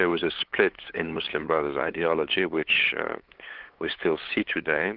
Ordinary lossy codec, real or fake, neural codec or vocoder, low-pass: Opus, 16 kbps; fake; codec, 16 kHz, 8 kbps, FunCodec, trained on LibriTTS, 25 frames a second; 5.4 kHz